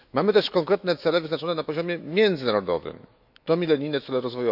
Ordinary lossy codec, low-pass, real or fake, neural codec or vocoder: none; 5.4 kHz; fake; autoencoder, 48 kHz, 128 numbers a frame, DAC-VAE, trained on Japanese speech